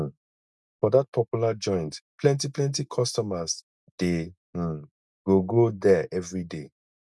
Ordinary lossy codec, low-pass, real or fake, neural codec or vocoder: none; none; real; none